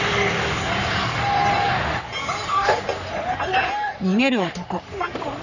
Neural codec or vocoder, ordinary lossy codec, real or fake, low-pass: codec, 44.1 kHz, 3.4 kbps, Pupu-Codec; none; fake; 7.2 kHz